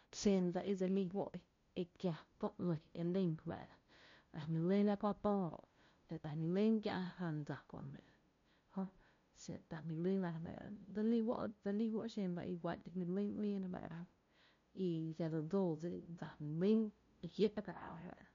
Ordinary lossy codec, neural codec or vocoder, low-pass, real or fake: MP3, 48 kbps; codec, 16 kHz, 0.5 kbps, FunCodec, trained on LibriTTS, 25 frames a second; 7.2 kHz; fake